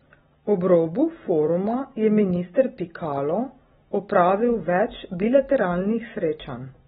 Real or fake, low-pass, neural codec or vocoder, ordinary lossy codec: real; 19.8 kHz; none; AAC, 16 kbps